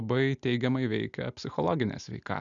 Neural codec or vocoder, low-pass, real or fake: none; 7.2 kHz; real